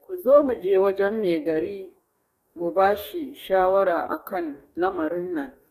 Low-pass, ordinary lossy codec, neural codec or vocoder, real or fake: 14.4 kHz; none; codec, 44.1 kHz, 2.6 kbps, DAC; fake